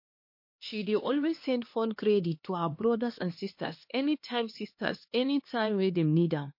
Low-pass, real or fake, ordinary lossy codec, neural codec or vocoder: 5.4 kHz; fake; MP3, 32 kbps; codec, 16 kHz, 2 kbps, X-Codec, HuBERT features, trained on LibriSpeech